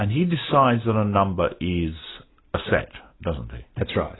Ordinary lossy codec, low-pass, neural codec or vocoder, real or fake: AAC, 16 kbps; 7.2 kHz; none; real